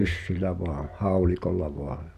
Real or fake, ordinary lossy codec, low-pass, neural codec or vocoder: real; none; 14.4 kHz; none